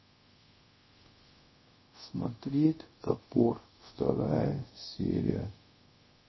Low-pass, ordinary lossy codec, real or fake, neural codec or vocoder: 7.2 kHz; MP3, 24 kbps; fake; codec, 24 kHz, 0.5 kbps, DualCodec